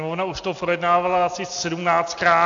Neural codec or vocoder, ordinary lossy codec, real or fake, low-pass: none; AAC, 64 kbps; real; 7.2 kHz